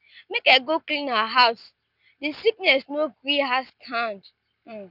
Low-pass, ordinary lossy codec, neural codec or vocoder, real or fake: 5.4 kHz; none; none; real